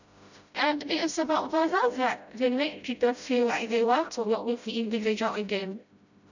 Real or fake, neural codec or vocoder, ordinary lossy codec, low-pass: fake; codec, 16 kHz, 0.5 kbps, FreqCodec, smaller model; none; 7.2 kHz